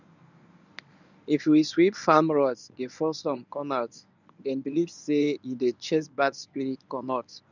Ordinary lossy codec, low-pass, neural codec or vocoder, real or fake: none; 7.2 kHz; codec, 24 kHz, 0.9 kbps, WavTokenizer, medium speech release version 1; fake